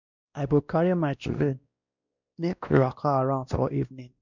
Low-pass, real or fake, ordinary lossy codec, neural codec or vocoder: 7.2 kHz; fake; none; codec, 16 kHz, 1 kbps, X-Codec, WavLM features, trained on Multilingual LibriSpeech